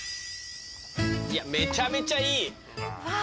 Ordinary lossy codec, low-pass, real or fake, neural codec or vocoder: none; none; real; none